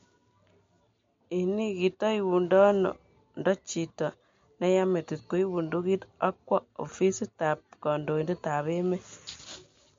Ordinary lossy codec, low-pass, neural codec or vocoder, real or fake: MP3, 48 kbps; 7.2 kHz; none; real